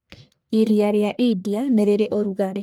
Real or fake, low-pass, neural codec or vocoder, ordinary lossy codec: fake; none; codec, 44.1 kHz, 1.7 kbps, Pupu-Codec; none